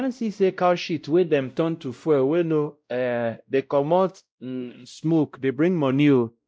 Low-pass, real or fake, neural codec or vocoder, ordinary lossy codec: none; fake; codec, 16 kHz, 0.5 kbps, X-Codec, WavLM features, trained on Multilingual LibriSpeech; none